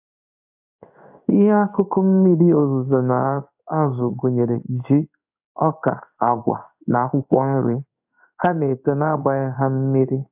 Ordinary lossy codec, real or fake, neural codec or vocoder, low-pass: AAC, 32 kbps; fake; codec, 16 kHz in and 24 kHz out, 1 kbps, XY-Tokenizer; 3.6 kHz